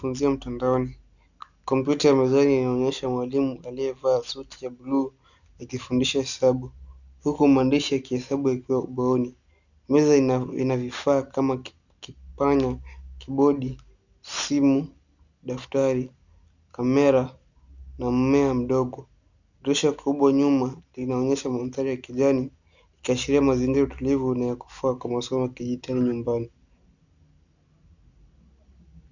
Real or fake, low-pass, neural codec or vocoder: real; 7.2 kHz; none